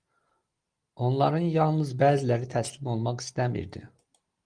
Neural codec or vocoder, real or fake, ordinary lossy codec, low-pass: none; real; Opus, 24 kbps; 9.9 kHz